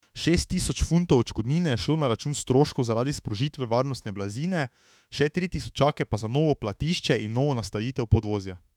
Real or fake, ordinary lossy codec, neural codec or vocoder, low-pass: fake; none; autoencoder, 48 kHz, 32 numbers a frame, DAC-VAE, trained on Japanese speech; 19.8 kHz